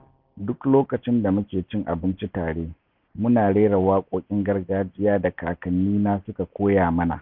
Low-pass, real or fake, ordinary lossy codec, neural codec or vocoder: 5.4 kHz; real; none; none